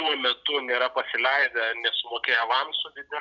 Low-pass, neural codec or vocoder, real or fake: 7.2 kHz; none; real